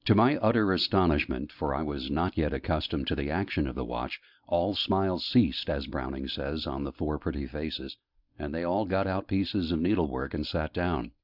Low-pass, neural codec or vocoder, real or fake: 5.4 kHz; none; real